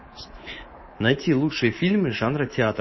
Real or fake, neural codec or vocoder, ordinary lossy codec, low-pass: fake; codec, 24 kHz, 3.1 kbps, DualCodec; MP3, 24 kbps; 7.2 kHz